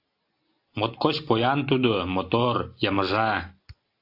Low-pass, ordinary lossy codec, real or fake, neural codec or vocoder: 5.4 kHz; AAC, 32 kbps; real; none